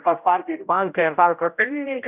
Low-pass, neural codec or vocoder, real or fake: 3.6 kHz; codec, 16 kHz, 0.5 kbps, X-Codec, HuBERT features, trained on general audio; fake